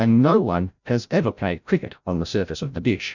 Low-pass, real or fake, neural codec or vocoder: 7.2 kHz; fake; codec, 16 kHz, 0.5 kbps, FreqCodec, larger model